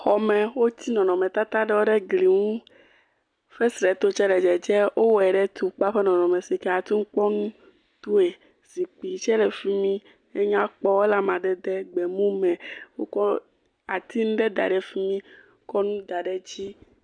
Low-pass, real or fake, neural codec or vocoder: 9.9 kHz; real; none